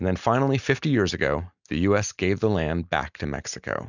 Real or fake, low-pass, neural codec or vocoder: real; 7.2 kHz; none